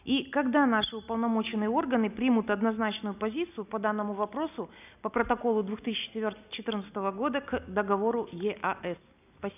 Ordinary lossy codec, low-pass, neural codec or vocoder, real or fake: none; 3.6 kHz; none; real